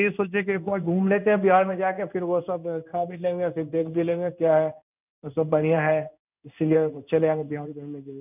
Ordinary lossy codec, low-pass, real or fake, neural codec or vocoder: none; 3.6 kHz; fake; codec, 16 kHz in and 24 kHz out, 1 kbps, XY-Tokenizer